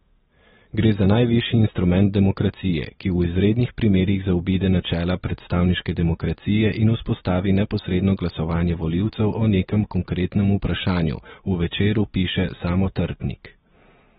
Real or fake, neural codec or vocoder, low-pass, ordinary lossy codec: real; none; 19.8 kHz; AAC, 16 kbps